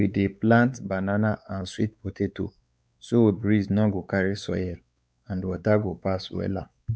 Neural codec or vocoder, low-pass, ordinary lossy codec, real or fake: codec, 16 kHz, 4 kbps, X-Codec, WavLM features, trained on Multilingual LibriSpeech; none; none; fake